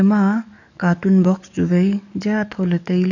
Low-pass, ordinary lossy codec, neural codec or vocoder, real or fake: 7.2 kHz; none; codec, 44.1 kHz, 7.8 kbps, DAC; fake